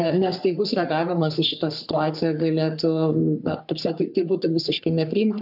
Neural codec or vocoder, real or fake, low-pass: codec, 44.1 kHz, 3.4 kbps, Pupu-Codec; fake; 5.4 kHz